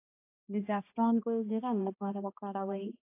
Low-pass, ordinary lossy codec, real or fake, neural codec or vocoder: 3.6 kHz; AAC, 24 kbps; fake; codec, 16 kHz, 1 kbps, X-Codec, HuBERT features, trained on balanced general audio